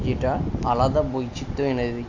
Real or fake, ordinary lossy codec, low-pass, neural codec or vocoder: real; AAC, 48 kbps; 7.2 kHz; none